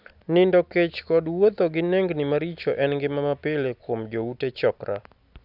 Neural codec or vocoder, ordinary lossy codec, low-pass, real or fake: none; none; 5.4 kHz; real